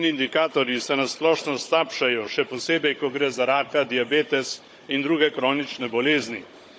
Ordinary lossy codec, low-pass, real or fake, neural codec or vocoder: none; none; fake; codec, 16 kHz, 16 kbps, FunCodec, trained on Chinese and English, 50 frames a second